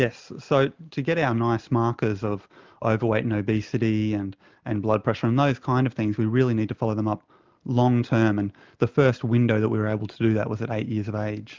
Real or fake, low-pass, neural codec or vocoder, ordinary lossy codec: real; 7.2 kHz; none; Opus, 24 kbps